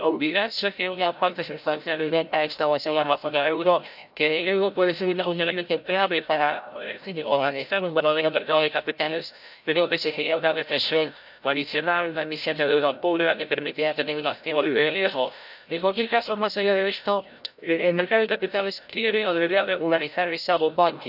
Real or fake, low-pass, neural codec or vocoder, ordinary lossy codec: fake; 5.4 kHz; codec, 16 kHz, 0.5 kbps, FreqCodec, larger model; none